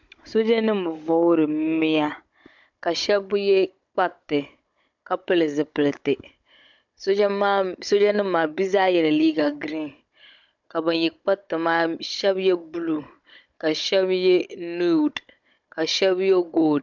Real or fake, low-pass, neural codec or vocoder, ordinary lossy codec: fake; 7.2 kHz; codec, 16 kHz, 16 kbps, FunCodec, trained on Chinese and English, 50 frames a second; MP3, 64 kbps